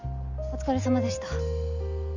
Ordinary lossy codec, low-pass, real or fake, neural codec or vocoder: none; 7.2 kHz; real; none